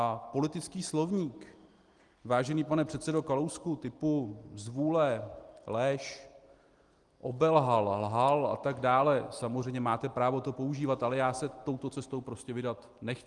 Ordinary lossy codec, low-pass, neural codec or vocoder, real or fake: Opus, 24 kbps; 10.8 kHz; none; real